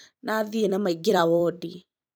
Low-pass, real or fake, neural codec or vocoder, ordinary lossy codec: none; fake; vocoder, 44.1 kHz, 128 mel bands every 256 samples, BigVGAN v2; none